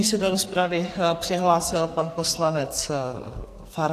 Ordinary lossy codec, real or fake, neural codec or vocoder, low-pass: AAC, 64 kbps; fake; codec, 44.1 kHz, 2.6 kbps, SNAC; 14.4 kHz